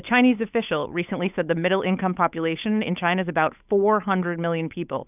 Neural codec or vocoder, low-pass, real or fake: codec, 16 kHz, 8 kbps, FunCodec, trained on Chinese and English, 25 frames a second; 3.6 kHz; fake